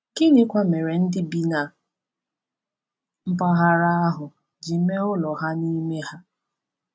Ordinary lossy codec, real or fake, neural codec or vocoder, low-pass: none; real; none; none